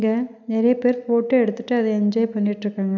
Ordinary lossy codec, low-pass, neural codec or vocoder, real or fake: none; 7.2 kHz; none; real